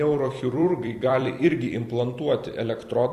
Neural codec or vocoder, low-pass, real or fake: vocoder, 44.1 kHz, 128 mel bands every 256 samples, BigVGAN v2; 14.4 kHz; fake